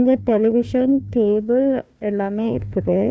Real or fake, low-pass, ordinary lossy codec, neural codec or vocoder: fake; none; none; codec, 16 kHz, 1 kbps, FunCodec, trained on Chinese and English, 50 frames a second